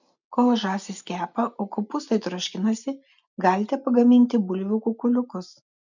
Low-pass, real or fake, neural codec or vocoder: 7.2 kHz; real; none